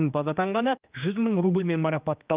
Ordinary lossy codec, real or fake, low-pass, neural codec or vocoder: Opus, 32 kbps; fake; 3.6 kHz; codec, 16 kHz, 2 kbps, X-Codec, HuBERT features, trained on general audio